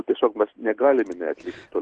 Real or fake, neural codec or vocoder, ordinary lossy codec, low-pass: real; none; Opus, 16 kbps; 10.8 kHz